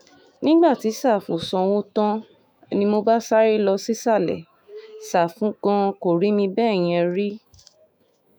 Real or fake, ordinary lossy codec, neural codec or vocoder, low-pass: fake; none; autoencoder, 48 kHz, 128 numbers a frame, DAC-VAE, trained on Japanese speech; none